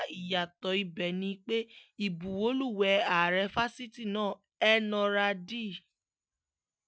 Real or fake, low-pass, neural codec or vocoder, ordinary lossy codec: real; none; none; none